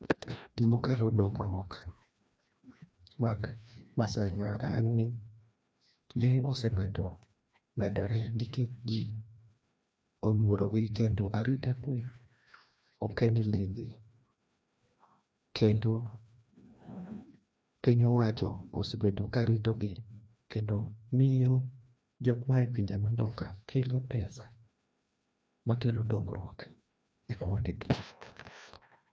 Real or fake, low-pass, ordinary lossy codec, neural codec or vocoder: fake; none; none; codec, 16 kHz, 1 kbps, FreqCodec, larger model